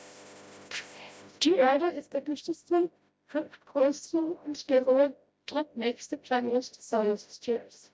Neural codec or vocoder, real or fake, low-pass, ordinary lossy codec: codec, 16 kHz, 0.5 kbps, FreqCodec, smaller model; fake; none; none